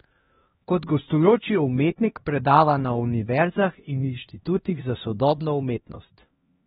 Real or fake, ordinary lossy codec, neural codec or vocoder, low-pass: fake; AAC, 16 kbps; codec, 16 kHz, 1 kbps, X-Codec, HuBERT features, trained on LibriSpeech; 7.2 kHz